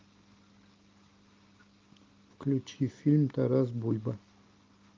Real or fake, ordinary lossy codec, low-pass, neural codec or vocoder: real; Opus, 32 kbps; 7.2 kHz; none